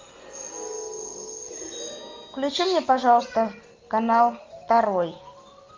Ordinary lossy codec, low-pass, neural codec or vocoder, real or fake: Opus, 32 kbps; 7.2 kHz; none; real